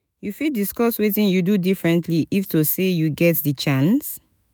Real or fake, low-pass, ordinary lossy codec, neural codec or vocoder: fake; none; none; autoencoder, 48 kHz, 128 numbers a frame, DAC-VAE, trained on Japanese speech